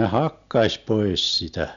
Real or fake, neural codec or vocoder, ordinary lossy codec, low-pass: real; none; MP3, 96 kbps; 7.2 kHz